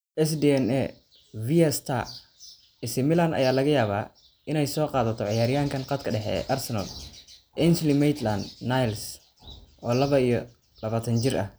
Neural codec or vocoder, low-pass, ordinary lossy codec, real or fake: none; none; none; real